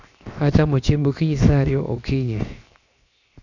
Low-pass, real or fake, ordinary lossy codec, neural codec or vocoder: 7.2 kHz; fake; none; codec, 16 kHz, 0.7 kbps, FocalCodec